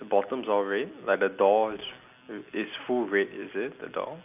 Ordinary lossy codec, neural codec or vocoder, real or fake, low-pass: none; none; real; 3.6 kHz